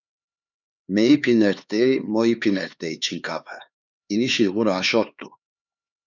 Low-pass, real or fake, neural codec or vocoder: 7.2 kHz; fake; codec, 16 kHz, 4 kbps, X-Codec, HuBERT features, trained on LibriSpeech